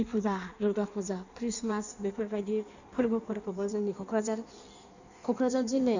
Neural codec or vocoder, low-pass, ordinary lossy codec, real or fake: codec, 16 kHz in and 24 kHz out, 1.1 kbps, FireRedTTS-2 codec; 7.2 kHz; none; fake